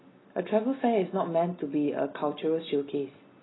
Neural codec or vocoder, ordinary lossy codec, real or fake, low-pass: none; AAC, 16 kbps; real; 7.2 kHz